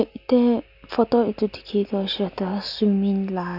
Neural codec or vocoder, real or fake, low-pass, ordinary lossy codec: none; real; 5.4 kHz; none